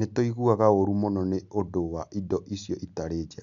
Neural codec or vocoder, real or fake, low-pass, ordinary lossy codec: none; real; 7.2 kHz; none